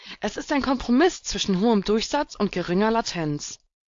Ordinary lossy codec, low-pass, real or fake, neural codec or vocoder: AAC, 48 kbps; 7.2 kHz; fake; codec, 16 kHz, 4.8 kbps, FACodec